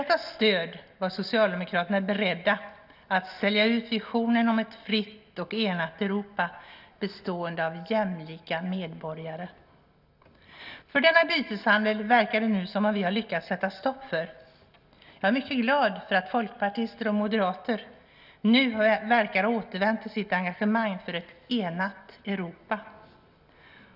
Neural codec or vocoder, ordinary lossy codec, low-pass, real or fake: none; none; 5.4 kHz; real